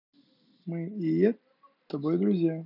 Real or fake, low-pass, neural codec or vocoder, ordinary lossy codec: real; 5.4 kHz; none; none